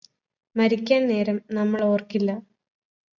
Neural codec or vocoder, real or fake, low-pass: none; real; 7.2 kHz